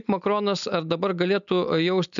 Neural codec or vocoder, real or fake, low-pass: none; real; 7.2 kHz